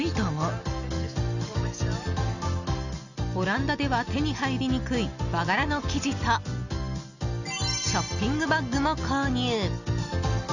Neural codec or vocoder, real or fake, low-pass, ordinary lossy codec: none; real; 7.2 kHz; none